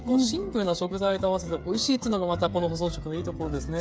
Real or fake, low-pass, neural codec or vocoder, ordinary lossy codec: fake; none; codec, 16 kHz, 8 kbps, FreqCodec, smaller model; none